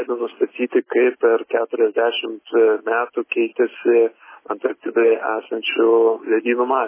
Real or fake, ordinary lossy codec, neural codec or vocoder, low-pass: real; MP3, 16 kbps; none; 3.6 kHz